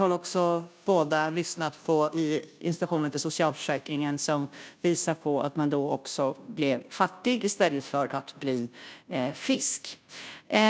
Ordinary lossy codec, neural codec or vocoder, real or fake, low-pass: none; codec, 16 kHz, 0.5 kbps, FunCodec, trained on Chinese and English, 25 frames a second; fake; none